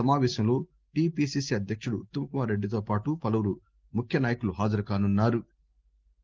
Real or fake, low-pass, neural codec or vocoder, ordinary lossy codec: real; 7.2 kHz; none; Opus, 16 kbps